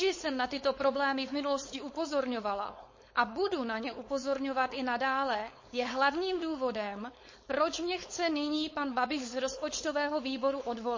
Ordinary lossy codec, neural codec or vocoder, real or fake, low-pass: MP3, 32 kbps; codec, 16 kHz, 4.8 kbps, FACodec; fake; 7.2 kHz